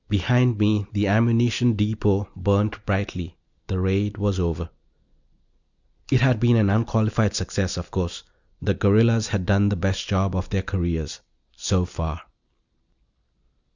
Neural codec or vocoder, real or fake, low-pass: none; real; 7.2 kHz